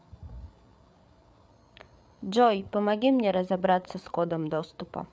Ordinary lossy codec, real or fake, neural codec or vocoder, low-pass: none; fake; codec, 16 kHz, 8 kbps, FreqCodec, larger model; none